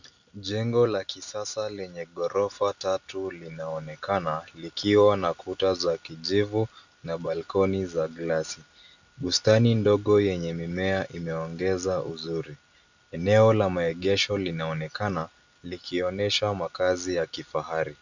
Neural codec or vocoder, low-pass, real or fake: none; 7.2 kHz; real